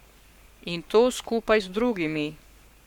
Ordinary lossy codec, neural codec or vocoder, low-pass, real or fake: none; codec, 44.1 kHz, 7.8 kbps, Pupu-Codec; 19.8 kHz; fake